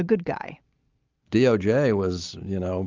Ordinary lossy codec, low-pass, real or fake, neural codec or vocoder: Opus, 24 kbps; 7.2 kHz; real; none